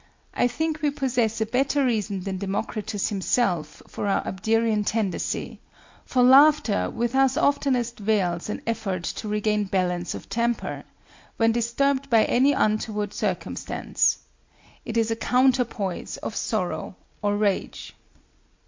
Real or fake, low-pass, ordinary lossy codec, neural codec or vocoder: real; 7.2 kHz; MP3, 48 kbps; none